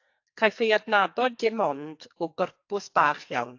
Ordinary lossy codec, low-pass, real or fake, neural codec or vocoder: AAC, 48 kbps; 7.2 kHz; fake; codec, 44.1 kHz, 2.6 kbps, SNAC